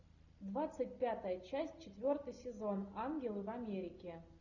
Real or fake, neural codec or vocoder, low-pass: real; none; 7.2 kHz